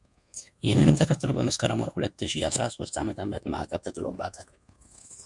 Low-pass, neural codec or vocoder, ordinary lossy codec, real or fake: 10.8 kHz; codec, 24 kHz, 1.2 kbps, DualCodec; MP3, 64 kbps; fake